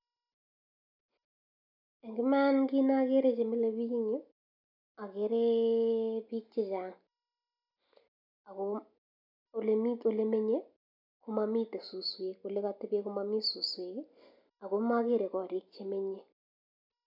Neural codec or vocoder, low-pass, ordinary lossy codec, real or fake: none; 5.4 kHz; none; real